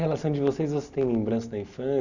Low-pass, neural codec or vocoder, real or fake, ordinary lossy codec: 7.2 kHz; none; real; none